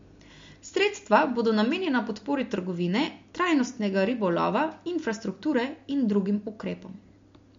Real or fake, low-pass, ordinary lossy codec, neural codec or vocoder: real; 7.2 kHz; MP3, 48 kbps; none